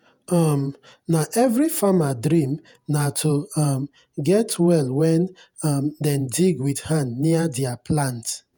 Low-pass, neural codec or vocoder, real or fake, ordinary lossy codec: none; vocoder, 48 kHz, 128 mel bands, Vocos; fake; none